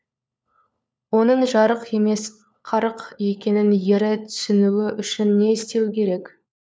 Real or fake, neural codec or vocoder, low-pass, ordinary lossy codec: fake; codec, 16 kHz, 4 kbps, FunCodec, trained on LibriTTS, 50 frames a second; none; none